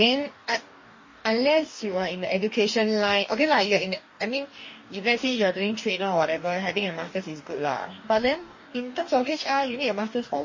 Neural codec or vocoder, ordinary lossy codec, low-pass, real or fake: codec, 44.1 kHz, 2.6 kbps, DAC; MP3, 32 kbps; 7.2 kHz; fake